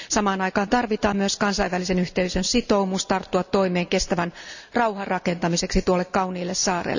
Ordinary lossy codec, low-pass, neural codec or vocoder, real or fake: none; 7.2 kHz; none; real